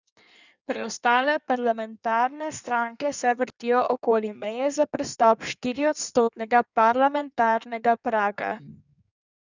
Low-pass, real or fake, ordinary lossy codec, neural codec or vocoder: 7.2 kHz; fake; none; codec, 16 kHz in and 24 kHz out, 1.1 kbps, FireRedTTS-2 codec